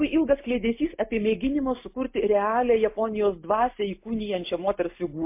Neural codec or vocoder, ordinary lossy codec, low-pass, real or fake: none; MP3, 24 kbps; 3.6 kHz; real